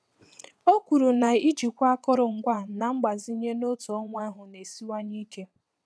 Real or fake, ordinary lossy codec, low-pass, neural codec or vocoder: fake; none; none; vocoder, 22.05 kHz, 80 mel bands, WaveNeXt